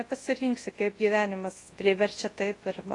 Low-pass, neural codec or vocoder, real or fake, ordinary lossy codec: 10.8 kHz; codec, 24 kHz, 0.9 kbps, WavTokenizer, large speech release; fake; AAC, 32 kbps